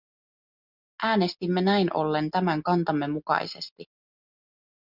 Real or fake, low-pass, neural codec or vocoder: real; 5.4 kHz; none